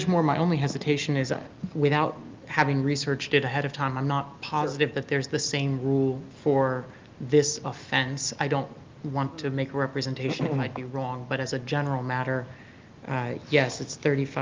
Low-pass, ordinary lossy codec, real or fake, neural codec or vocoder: 7.2 kHz; Opus, 32 kbps; real; none